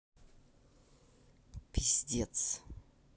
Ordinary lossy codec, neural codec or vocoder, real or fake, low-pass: none; none; real; none